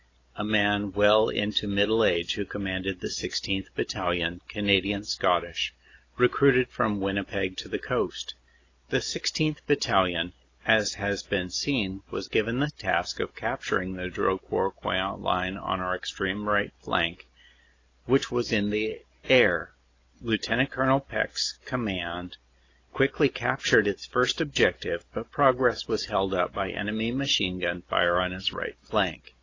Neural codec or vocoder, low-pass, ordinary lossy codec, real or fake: none; 7.2 kHz; AAC, 32 kbps; real